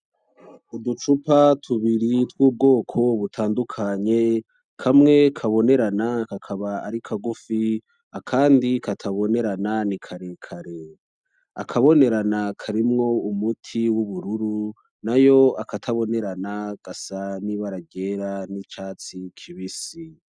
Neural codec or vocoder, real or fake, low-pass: none; real; 9.9 kHz